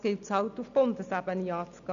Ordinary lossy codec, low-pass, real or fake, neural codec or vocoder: none; 7.2 kHz; real; none